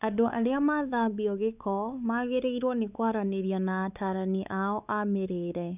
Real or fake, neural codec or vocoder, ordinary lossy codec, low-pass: fake; codec, 16 kHz, 4 kbps, X-Codec, WavLM features, trained on Multilingual LibriSpeech; none; 3.6 kHz